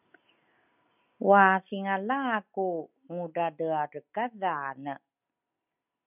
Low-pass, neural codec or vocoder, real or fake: 3.6 kHz; none; real